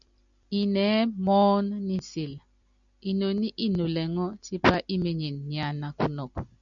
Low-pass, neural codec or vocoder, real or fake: 7.2 kHz; none; real